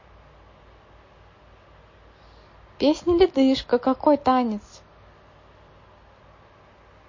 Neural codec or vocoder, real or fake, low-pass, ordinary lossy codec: none; real; 7.2 kHz; MP3, 32 kbps